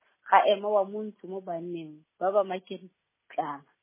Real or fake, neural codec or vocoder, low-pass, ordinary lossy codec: real; none; 3.6 kHz; MP3, 16 kbps